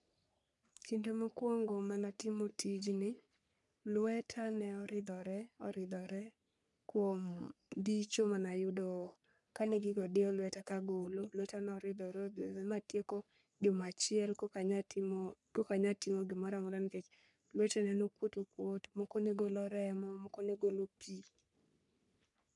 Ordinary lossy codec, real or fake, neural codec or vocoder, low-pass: none; fake; codec, 44.1 kHz, 3.4 kbps, Pupu-Codec; 10.8 kHz